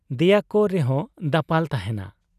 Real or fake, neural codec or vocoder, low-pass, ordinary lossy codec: real; none; 14.4 kHz; none